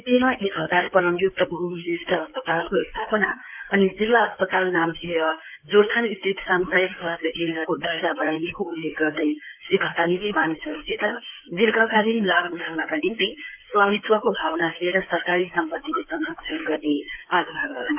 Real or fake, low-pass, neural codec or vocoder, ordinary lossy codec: fake; 3.6 kHz; codec, 16 kHz in and 24 kHz out, 2.2 kbps, FireRedTTS-2 codec; AAC, 32 kbps